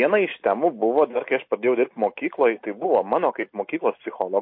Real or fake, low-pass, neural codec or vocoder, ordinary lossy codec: real; 5.4 kHz; none; MP3, 32 kbps